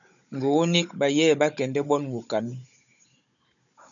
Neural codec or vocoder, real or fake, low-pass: codec, 16 kHz, 16 kbps, FunCodec, trained on Chinese and English, 50 frames a second; fake; 7.2 kHz